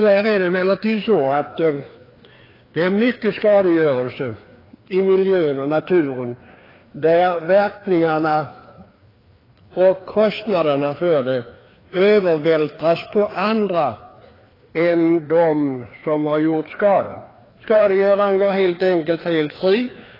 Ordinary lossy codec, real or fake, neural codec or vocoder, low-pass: AAC, 24 kbps; fake; codec, 16 kHz, 2 kbps, FreqCodec, larger model; 5.4 kHz